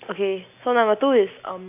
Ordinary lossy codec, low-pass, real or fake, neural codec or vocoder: none; 3.6 kHz; real; none